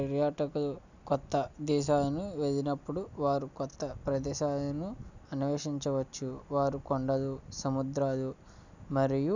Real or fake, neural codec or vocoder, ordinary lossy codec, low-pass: real; none; none; 7.2 kHz